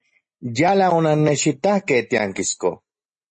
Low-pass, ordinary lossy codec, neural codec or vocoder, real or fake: 10.8 kHz; MP3, 32 kbps; none; real